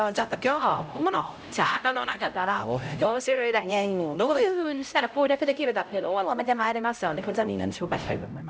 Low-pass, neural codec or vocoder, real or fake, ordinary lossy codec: none; codec, 16 kHz, 0.5 kbps, X-Codec, HuBERT features, trained on LibriSpeech; fake; none